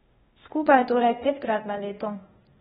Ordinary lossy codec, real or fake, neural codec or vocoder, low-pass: AAC, 16 kbps; fake; codec, 16 kHz, 0.8 kbps, ZipCodec; 7.2 kHz